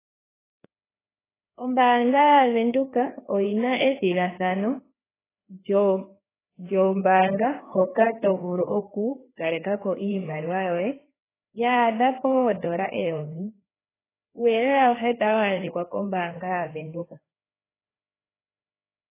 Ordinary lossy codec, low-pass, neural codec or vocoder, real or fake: AAC, 16 kbps; 3.6 kHz; codec, 16 kHz, 2 kbps, FreqCodec, larger model; fake